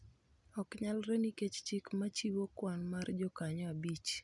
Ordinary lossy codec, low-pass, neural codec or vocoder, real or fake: none; 10.8 kHz; none; real